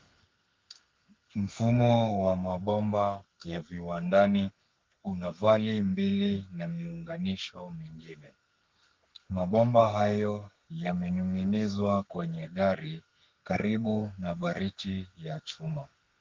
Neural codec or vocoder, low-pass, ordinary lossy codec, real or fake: codec, 32 kHz, 1.9 kbps, SNAC; 7.2 kHz; Opus, 32 kbps; fake